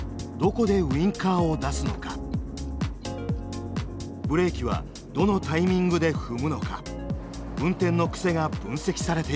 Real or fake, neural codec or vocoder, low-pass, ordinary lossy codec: real; none; none; none